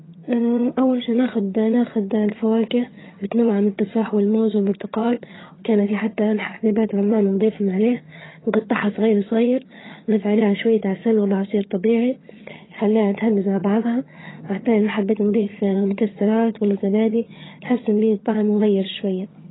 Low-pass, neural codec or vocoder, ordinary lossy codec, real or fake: 7.2 kHz; vocoder, 22.05 kHz, 80 mel bands, HiFi-GAN; AAC, 16 kbps; fake